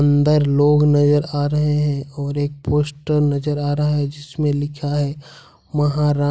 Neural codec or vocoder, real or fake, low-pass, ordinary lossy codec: none; real; none; none